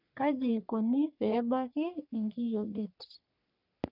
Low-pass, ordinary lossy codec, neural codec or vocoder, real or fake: 5.4 kHz; none; codec, 44.1 kHz, 3.4 kbps, Pupu-Codec; fake